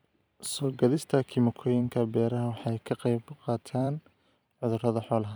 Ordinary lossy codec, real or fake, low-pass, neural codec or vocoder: none; fake; none; vocoder, 44.1 kHz, 128 mel bands every 256 samples, BigVGAN v2